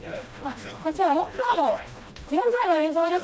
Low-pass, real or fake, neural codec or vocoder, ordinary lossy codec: none; fake; codec, 16 kHz, 1 kbps, FreqCodec, smaller model; none